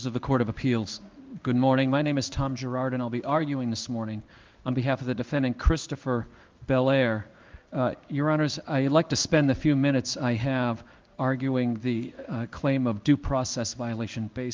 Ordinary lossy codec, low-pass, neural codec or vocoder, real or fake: Opus, 24 kbps; 7.2 kHz; codec, 16 kHz in and 24 kHz out, 1 kbps, XY-Tokenizer; fake